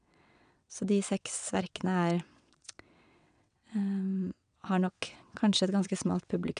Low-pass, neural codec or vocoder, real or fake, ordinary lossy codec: 9.9 kHz; vocoder, 22.05 kHz, 80 mel bands, WaveNeXt; fake; none